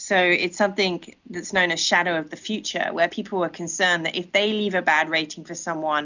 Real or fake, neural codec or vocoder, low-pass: real; none; 7.2 kHz